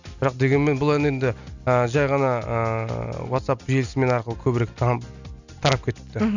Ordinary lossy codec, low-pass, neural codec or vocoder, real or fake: none; 7.2 kHz; none; real